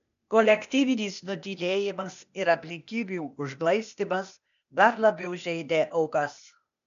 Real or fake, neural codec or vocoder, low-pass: fake; codec, 16 kHz, 0.8 kbps, ZipCodec; 7.2 kHz